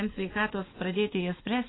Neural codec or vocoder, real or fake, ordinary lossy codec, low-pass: none; real; AAC, 16 kbps; 7.2 kHz